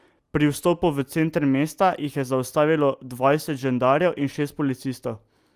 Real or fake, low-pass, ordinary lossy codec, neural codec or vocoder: real; 14.4 kHz; Opus, 32 kbps; none